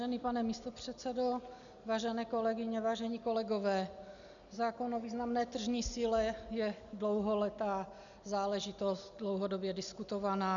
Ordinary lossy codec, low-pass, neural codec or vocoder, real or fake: MP3, 96 kbps; 7.2 kHz; none; real